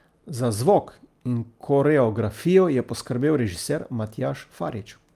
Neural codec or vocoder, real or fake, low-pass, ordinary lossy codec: none; real; 14.4 kHz; Opus, 32 kbps